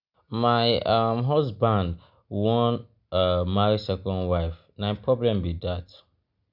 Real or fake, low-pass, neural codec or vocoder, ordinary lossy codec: real; 5.4 kHz; none; none